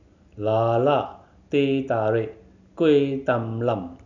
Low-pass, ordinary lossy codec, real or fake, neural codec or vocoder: 7.2 kHz; none; real; none